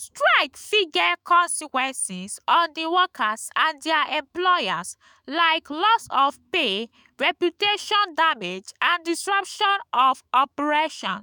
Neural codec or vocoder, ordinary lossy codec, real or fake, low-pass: autoencoder, 48 kHz, 128 numbers a frame, DAC-VAE, trained on Japanese speech; none; fake; none